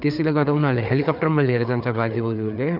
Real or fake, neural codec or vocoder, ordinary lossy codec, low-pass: fake; codec, 16 kHz, 4 kbps, FreqCodec, larger model; none; 5.4 kHz